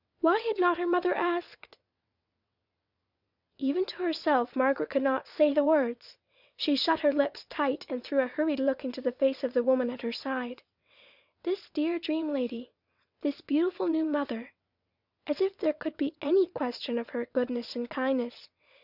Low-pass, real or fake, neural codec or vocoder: 5.4 kHz; fake; vocoder, 22.05 kHz, 80 mel bands, WaveNeXt